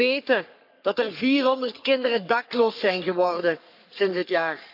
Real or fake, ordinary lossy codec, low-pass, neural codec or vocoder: fake; none; 5.4 kHz; codec, 44.1 kHz, 3.4 kbps, Pupu-Codec